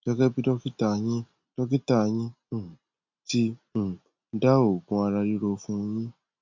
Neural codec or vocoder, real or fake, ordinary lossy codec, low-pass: none; real; MP3, 64 kbps; 7.2 kHz